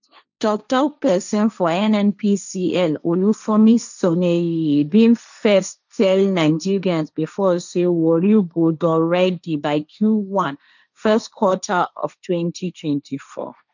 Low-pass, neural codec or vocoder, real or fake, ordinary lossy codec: 7.2 kHz; codec, 16 kHz, 1.1 kbps, Voila-Tokenizer; fake; none